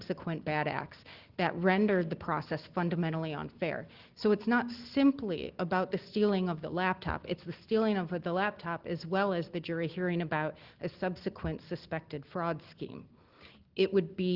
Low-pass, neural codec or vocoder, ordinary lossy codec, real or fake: 5.4 kHz; none; Opus, 16 kbps; real